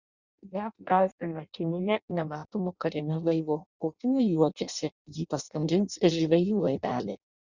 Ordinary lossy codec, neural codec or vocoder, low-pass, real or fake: Opus, 64 kbps; codec, 16 kHz in and 24 kHz out, 0.6 kbps, FireRedTTS-2 codec; 7.2 kHz; fake